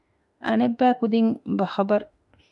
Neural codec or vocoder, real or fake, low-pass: autoencoder, 48 kHz, 32 numbers a frame, DAC-VAE, trained on Japanese speech; fake; 10.8 kHz